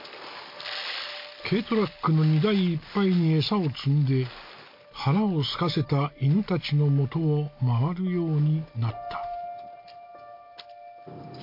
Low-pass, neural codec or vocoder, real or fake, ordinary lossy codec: 5.4 kHz; none; real; MP3, 32 kbps